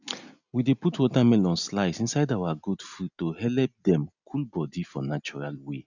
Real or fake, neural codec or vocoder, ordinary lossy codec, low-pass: real; none; none; 7.2 kHz